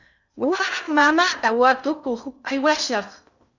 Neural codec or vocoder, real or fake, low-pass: codec, 16 kHz in and 24 kHz out, 0.6 kbps, FocalCodec, streaming, 2048 codes; fake; 7.2 kHz